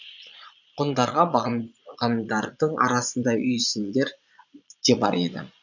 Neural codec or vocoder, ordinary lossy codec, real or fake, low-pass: vocoder, 22.05 kHz, 80 mel bands, Vocos; none; fake; 7.2 kHz